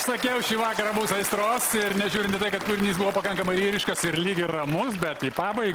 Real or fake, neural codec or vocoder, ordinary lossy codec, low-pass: real; none; Opus, 16 kbps; 19.8 kHz